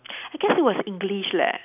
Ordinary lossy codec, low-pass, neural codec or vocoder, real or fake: none; 3.6 kHz; none; real